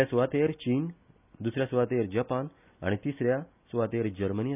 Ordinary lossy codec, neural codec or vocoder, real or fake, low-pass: none; none; real; 3.6 kHz